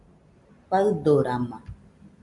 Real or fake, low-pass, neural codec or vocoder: real; 10.8 kHz; none